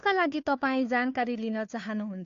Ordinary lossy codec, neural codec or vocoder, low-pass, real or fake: AAC, 48 kbps; codec, 16 kHz, 2 kbps, FunCodec, trained on Chinese and English, 25 frames a second; 7.2 kHz; fake